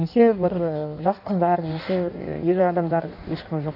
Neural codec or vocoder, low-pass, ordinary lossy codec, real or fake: codec, 16 kHz in and 24 kHz out, 1.1 kbps, FireRedTTS-2 codec; 5.4 kHz; none; fake